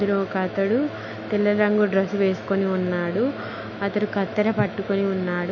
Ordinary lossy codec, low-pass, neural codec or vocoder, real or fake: none; 7.2 kHz; autoencoder, 48 kHz, 128 numbers a frame, DAC-VAE, trained on Japanese speech; fake